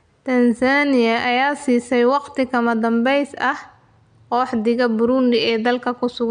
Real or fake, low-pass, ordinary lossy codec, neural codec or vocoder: real; 9.9 kHz; MP3, 64 kbps; none